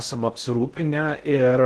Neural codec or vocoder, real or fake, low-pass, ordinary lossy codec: codec, 16 kHz in and 24 kHz out, 0.6 kbps, FocalCodec, streaming, 2048 codes; fake; 10.8 kHz; Opus, 16 kbps